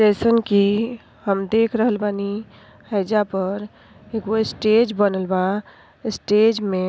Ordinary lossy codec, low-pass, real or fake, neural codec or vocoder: none; none; real; none